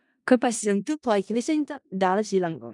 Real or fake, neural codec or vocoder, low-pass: fake; codec, 16 kHz in and 24 kHz out, 0.4 kbps, LongCat-Audio-Codec, four codebook decoder; 10.8 kHz